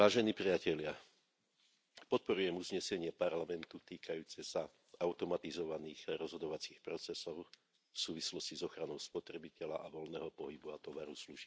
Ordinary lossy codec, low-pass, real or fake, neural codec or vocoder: none; none; real; none